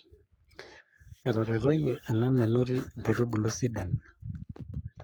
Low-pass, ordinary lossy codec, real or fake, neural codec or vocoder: none; none; fake; codec, 44.1 kHz, 3.4 kbps, Pupu-Codec